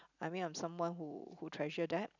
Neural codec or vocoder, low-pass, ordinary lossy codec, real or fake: none; 7.2 kHz; none; real